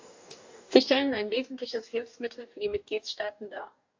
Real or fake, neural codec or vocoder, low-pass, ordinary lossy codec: fake; codec, 44.1 kHz, 2.6 kbps, DAC; 7.2 kHz; AAC, 48 kbps